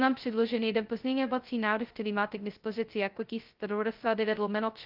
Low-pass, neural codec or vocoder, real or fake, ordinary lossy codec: 5.4 kHz; codec, 16 kHz, 0.2 kbps, FocalCodec; fake; Opus, 32 kbps